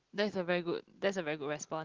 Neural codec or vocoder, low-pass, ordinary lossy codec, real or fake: none; 7.2 kHz; Opus, 16 kbps; real